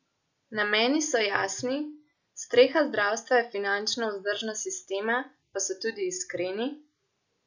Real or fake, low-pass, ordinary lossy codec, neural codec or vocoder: real; 7.2 kHz; none; none